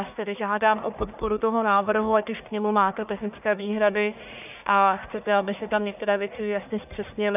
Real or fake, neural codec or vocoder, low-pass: fake; codec, 44.1 kHz, 1.7 kbps, Pupu-Codec; 3.6 kHz